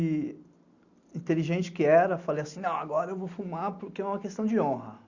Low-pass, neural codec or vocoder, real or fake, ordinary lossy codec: 7.2 kHz; none; real; Opus, 64 kbps